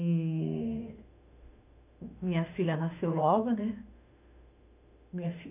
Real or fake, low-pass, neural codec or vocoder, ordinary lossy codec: fake; 3.6 kHz; autoencoder, 48 kHz, 32 numbers a frame, DAC-VAE, trained on Japanese speech; none